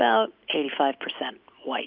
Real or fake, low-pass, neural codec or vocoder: real; 5.4 kHz; none